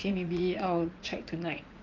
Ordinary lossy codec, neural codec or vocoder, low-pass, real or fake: Opus, 32 kbps; vocoder, 44.1 kHz, 80 mel bands, Vocos; 7.2 kHz; fake